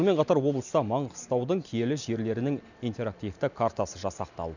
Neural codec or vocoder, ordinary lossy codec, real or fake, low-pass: none; none; real; 7.2 kHz